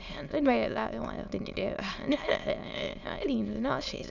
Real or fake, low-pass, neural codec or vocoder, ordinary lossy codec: fake; 7.2 kHz; autoencoder, 22.05 kHz, a latent of 192 numbers a frame, VITS, trained on many speakers; none